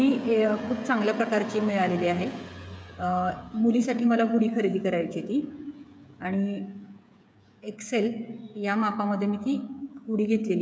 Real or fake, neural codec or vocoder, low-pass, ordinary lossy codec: fake; codec, 16 kHz, 16 kbps, FreqCodec, smaller model; none; none